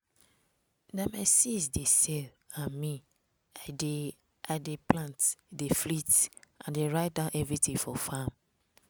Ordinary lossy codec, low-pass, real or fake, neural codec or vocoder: none; none; real; none